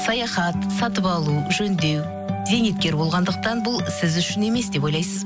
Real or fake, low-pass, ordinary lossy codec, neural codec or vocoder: real; none; none; none